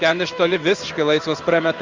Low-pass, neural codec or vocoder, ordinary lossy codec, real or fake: 7.2 kHz; codec, 16 kHz in and 24 kHz out, 1 kbps, XY-Tokenizer; Opus, 32 kbps; fake